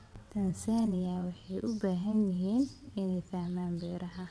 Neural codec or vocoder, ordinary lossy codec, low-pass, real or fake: vocoder, 22.05 kHz, 80 mel bands, WaveNeXt; none; none; fake